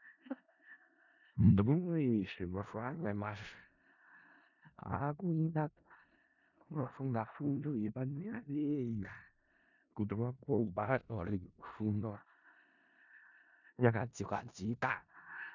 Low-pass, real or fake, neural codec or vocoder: 7.2 kHz; fake; codec, 16 kHz in and 24 kHz out, 0.4 kbps, LongCat-Audio-Codec, four codebook decoder